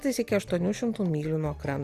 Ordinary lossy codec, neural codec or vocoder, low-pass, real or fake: Opus, 64 kbps; none; 14.4 kHz; real